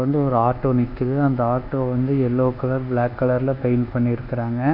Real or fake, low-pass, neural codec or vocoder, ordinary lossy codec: fake; 5.4 kHz; codec, 24 kHz, 1.2 kbps, DualCodec; none